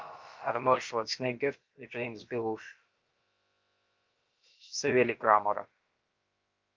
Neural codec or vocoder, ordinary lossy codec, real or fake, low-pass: codec, 16 kHz, about 1 kbps, DyCAST, with the encoder's durations; Opus, 24 kbps; fake; 7.2 kHz